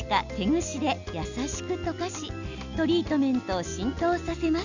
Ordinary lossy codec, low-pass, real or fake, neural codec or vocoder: none; 7.2 kHz; real; none